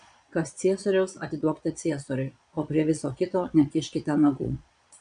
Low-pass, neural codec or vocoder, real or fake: 9.9 kHz; vocoder, 22.05 kHz, 80 mel bands, Vocos; fake